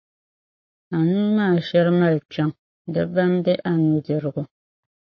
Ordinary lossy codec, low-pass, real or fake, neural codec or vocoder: MP3, 32 kbps; 7.2 kHz; fake; codec, 44.1 kHz, 7.8 kbps, Pupu-Codec